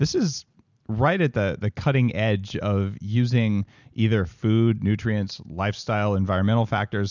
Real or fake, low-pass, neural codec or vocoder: real; 7.2 kHz; none